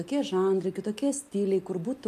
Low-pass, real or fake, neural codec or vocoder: 14.4 kHz; real; none